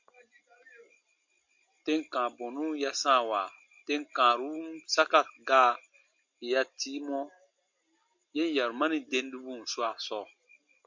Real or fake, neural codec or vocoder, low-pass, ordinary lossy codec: real; none; 7.2 kHz; MP3, 64 kbps